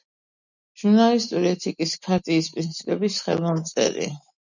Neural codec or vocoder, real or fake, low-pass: none; real; 7.2 kHz